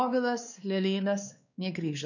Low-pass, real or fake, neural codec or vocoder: 7.2 kHz; fake; codec, 16 kHz, 2 kbps, X-Codec, WavLM features, trained on Multilingual LibriSpeech